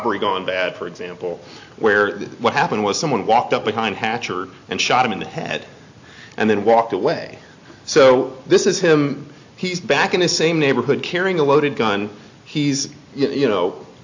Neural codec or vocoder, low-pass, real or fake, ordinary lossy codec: none; 7.2 kHz; real; AAC, 48 kbps